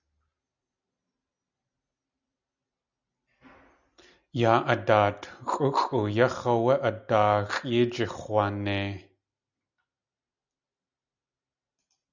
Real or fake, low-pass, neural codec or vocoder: real; 7.2 kHz; none